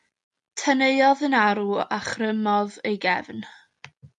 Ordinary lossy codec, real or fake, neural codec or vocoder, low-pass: MP3, 64 kbps; real; none; 10.8 kHz